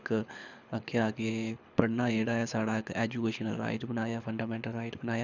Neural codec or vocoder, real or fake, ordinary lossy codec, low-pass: codec, 24 kHz, 6 kbps, HILCodec; fake; none; 7.2 kHz